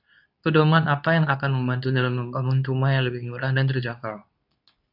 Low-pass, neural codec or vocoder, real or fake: 5.4 kHz; codec, 24 kHz, 0.9 kbps, WavTokenizer, medium speech release version 2; fake